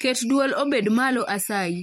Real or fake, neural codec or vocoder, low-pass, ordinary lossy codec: fake; vocoder, 44.1 kHz, 128 mel bands every 256 samples, BigVGAN v2; 19.8 kHz; MP3, 64 kbps